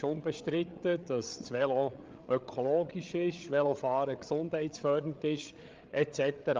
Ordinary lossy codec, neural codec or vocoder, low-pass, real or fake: Opus, 32 kbps; codec, 16 kHz, 8 kbps, FreqCodec, larger model; 7.2 kHz; fake